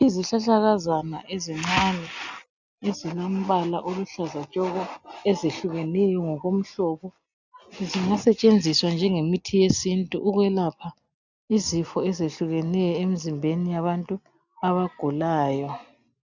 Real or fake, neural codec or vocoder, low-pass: real; none; 7.2 kHz